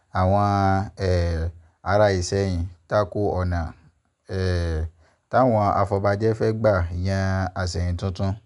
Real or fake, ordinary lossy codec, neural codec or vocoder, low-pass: real; none; none; 10.8 kHz